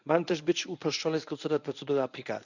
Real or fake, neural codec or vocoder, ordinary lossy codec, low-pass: fake; codec, 24 kHz, 0.9 kbps, WavTokenizer, medium speech release version 1; none; 7.2 kHz